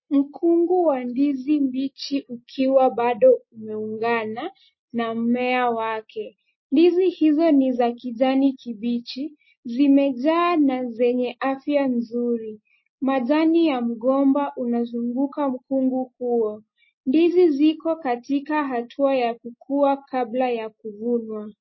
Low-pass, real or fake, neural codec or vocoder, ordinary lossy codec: 7.2 kHz; real; none; MP3, 24 kbps